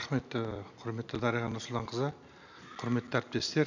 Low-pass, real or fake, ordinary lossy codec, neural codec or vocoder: 7.2 kHz; real; none; none